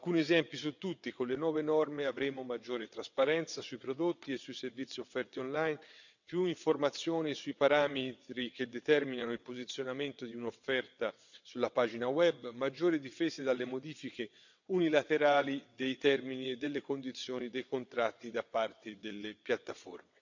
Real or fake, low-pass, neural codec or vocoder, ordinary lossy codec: fake; 7.2 kHz; vocoder, 22.05 kHz, 80 mel bands, WaveNeXt; none